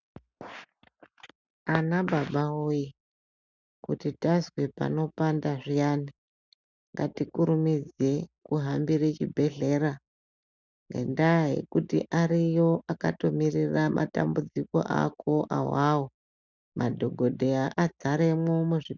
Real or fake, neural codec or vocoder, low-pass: real; none; 7.2 kHz